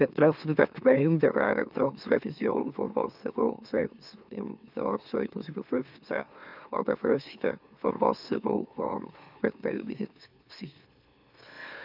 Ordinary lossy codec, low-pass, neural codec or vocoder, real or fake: none; 5.4 kHz; autoencoder, 44.1 kHz, a latent of 192 numbers a frame, MeloTTS; fake